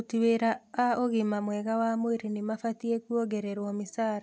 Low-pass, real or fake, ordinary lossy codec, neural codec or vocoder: none; real; none; none